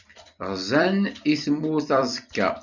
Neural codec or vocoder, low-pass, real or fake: vocoder, 44.1 kHz, 128 mel bands every 256 samples, BigVGAN v2; 7.2 kHz; fake